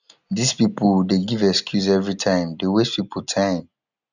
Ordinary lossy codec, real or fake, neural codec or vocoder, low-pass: none; real; none; 7.2 kHz